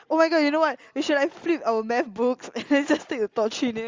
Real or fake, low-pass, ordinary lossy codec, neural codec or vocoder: real; 7.2 kHz; Opus, 32 kbps; none